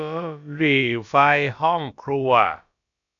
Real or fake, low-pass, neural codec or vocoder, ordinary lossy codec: fake; 7.2 kHz; codec, 16 kHz, about 1 kbps, DyCAST, with the encoder's durations; none